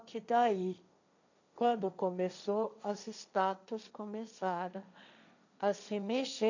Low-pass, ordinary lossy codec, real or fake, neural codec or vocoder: 7.2 kHz; none; fake; codec, 16 kHz, 1.1 kbps, Voila-Tokenizer